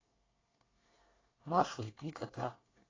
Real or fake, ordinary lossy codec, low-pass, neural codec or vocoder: fake; AAC, 32 kbps; 7.2 kHz; codec, 24 kHz, 1 kbps, SNAC